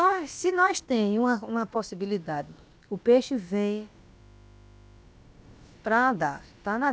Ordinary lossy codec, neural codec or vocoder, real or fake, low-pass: none; codec, 16 kHz, about 1 kbps, DyCAST, with the encoder's durations; fake; none